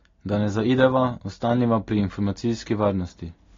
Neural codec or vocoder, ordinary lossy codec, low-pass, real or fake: none; AAC, 32 kbps; 7.2 kHz; real